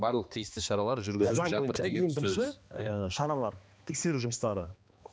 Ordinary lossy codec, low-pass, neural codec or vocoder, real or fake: none; none; codec, 16 kHz, 2 kbps, X-Codec, HuBERT features, trained on balanced general audio; fake